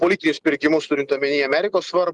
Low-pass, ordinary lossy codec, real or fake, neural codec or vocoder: 10.8 kHz; Opus, 24 kbps; real; none